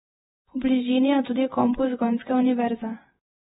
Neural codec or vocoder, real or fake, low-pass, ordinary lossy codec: vocoder, 48 kHz, 128 mel bands, Vocos; fake; 19.8 kHz; AAC, 16 kbps